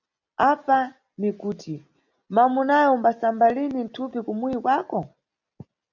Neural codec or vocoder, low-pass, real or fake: none; 7.2 kHz; real